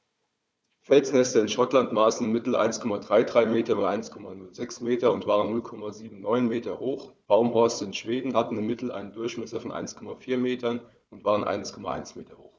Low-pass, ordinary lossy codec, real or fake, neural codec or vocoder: none; none; fake; codec, 16 kHz, 4 kbps, FunCodec, trained on Chinese and English, 50 frames a second